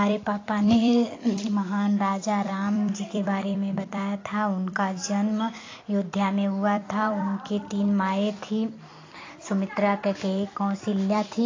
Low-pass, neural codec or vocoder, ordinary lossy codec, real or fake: 7.2 kHz; vocoder, 44.1 kHz, 128 mel bands every 256 samples, BigVGAN v2; AAC, 32 kbps; fake